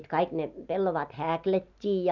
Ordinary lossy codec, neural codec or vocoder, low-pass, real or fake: none; none; 7.2 kHz; real